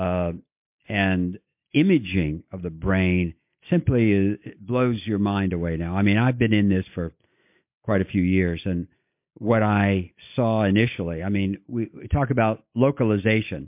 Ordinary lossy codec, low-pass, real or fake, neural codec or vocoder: MP3, 32 kbps; 3.6 kHz; real; none